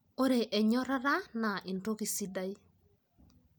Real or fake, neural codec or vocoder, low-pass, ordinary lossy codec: real; none; none; none